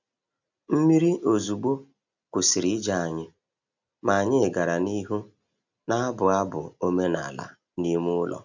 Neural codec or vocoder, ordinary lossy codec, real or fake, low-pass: none; none; real; 7.2 kHz